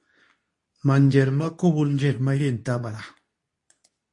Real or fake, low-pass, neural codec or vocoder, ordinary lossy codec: fake; 10.8 kHz; codec, 24 kHz, 0.9 kbps, WavTokenizer, medium speech release version 2; MP3, 48 kbps